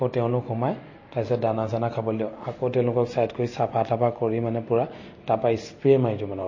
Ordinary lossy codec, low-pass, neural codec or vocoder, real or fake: MP3, 32 kbps; 7.2 kHz; none; real